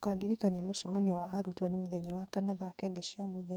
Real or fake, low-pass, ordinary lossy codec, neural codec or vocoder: fake; none; none; codec, 44.1 kHz, 2.6 kbps, DAC